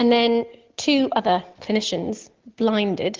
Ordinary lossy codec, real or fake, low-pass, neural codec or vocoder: Opus, 16 kbps; real; 7.2 kHz; none